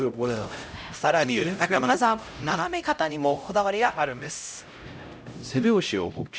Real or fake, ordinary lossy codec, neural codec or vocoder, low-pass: fake; none; codec, 16 kHz, 0.5 kbps, X-Codec, HuBERT features, trained on LibriSpeech; none